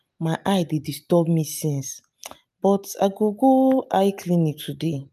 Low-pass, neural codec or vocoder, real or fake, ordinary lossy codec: 14.4 kHz; none; real; none